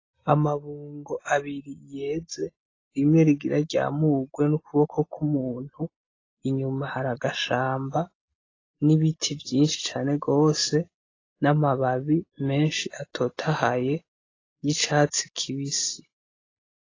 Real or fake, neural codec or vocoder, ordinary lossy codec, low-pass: real; none; AAC, 32 kbps; 7.2 kHz